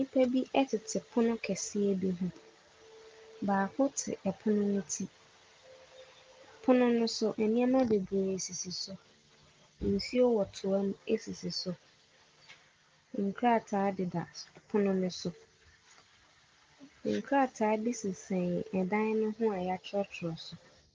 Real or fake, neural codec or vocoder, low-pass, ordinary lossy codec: real; none; 7.2 kHz; Opus, 32 kbps